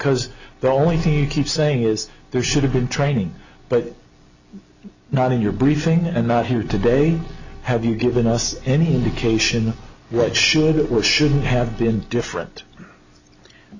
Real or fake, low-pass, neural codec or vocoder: real; 7.2 kHz; none